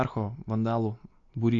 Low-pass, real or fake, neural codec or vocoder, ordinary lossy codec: 7.2 kHz; real; none; AAC, 48 kbps